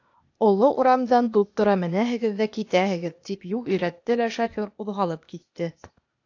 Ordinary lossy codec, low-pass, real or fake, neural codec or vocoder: AAC, 48 kbps; 7.2 kHz; fake; codec, 16 kHz, 0.8 kbps, ZipCodec